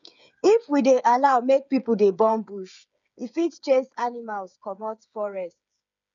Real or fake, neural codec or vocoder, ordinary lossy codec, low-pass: fake; codec, 16 kHz, 8 kbps, FreqCodec, smaller model; none; 7.2 kHz